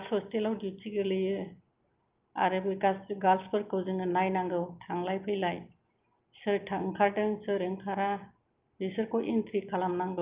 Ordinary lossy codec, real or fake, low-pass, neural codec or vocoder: Opus, 24 kbps; real; 3.6 kHz; none